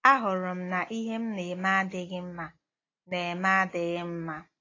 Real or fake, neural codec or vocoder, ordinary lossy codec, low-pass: real; none; AAC, 32 kbps; 7.2 kHz